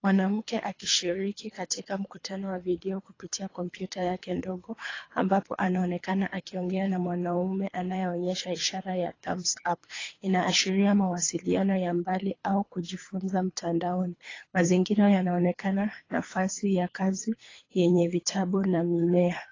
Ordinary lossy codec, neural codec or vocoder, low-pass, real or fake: AAC, 32 kbps; codec, 24 kHz, 3 kbps, HILCodec; 7.2 kHz; fake